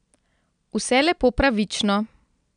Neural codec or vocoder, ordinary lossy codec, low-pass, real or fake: none; none; 9.9 kHz; real